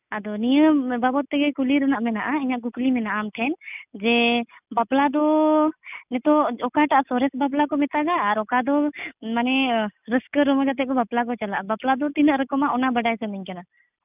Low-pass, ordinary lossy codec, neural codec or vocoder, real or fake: 3.6 kHz; none; none; real